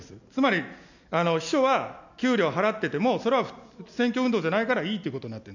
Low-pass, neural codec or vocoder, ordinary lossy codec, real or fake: 7.2 kHz; none; none; real